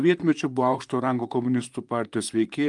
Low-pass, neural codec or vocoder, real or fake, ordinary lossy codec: 10.8 kHz; vocoder, 44.1 kHz, 128 mel bands, Pupu-Vocoder; fake; Opus, 32 kbps